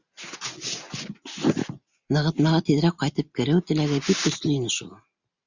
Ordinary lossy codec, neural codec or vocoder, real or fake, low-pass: Opus, 64 kbps; none; real; 7.2 kHz